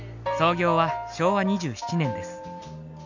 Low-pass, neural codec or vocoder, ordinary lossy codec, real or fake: 7.2 kHz; none; none; real